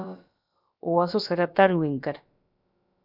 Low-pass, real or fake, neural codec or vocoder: 5.4 kHz; fake; codec, 16 kHz, about 1 kbps, DyCAST, with the encoder's durations